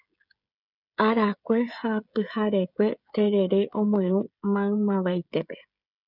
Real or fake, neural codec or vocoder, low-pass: fake; codec, 16 kHz, 16 kbps, FreqCodec, smaller model; 5.4 kHz